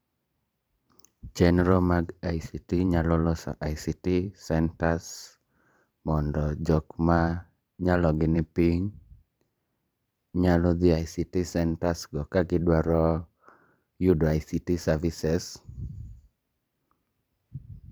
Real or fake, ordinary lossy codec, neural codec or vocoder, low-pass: fake; none; vocoder, 44.1 kHz, 128 mel bands every 512 samples, BigVGAN v2; none